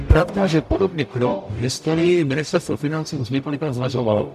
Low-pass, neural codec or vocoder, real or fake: 14.4 kHz; codec, 44.1 kHz, 0.9 kbps, DAC; fake